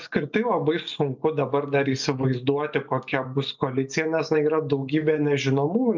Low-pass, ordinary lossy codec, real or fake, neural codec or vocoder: 7.2 kHz; MP3, 64 kbps; real; none